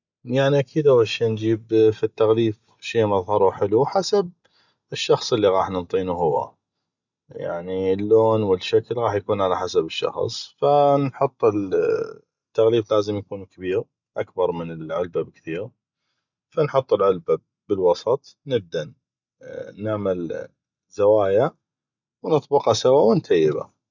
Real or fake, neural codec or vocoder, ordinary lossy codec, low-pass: real; none; none; 7.2 kHz